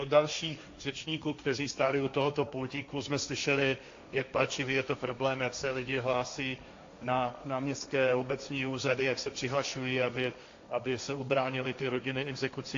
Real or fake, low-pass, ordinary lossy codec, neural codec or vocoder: fake; 7.2 kHz; AAC, 48 kbps; codec, 16 kHz, 1.1 kbps, Voila-Tokenizer